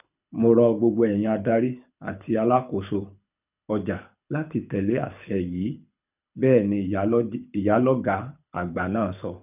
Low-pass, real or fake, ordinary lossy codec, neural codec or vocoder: 3.6 kHz; fake; none; codec, 24 kHz, 6 kbps, HILCodec